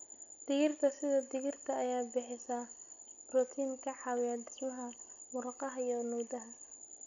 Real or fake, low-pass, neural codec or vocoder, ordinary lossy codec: real; 7.2 kHz; none; none